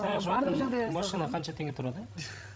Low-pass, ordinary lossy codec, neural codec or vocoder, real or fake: none; none; codec, 16 kHz, 16 kbps, FreqCodec, larger model; fake